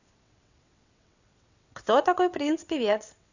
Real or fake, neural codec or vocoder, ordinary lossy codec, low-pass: fake; vocoder, 22.05 kHz, 80 mel bands, WaveNeXt; none; 7.2 kHz